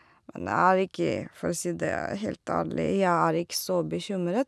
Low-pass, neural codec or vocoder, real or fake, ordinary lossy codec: none; none; real; none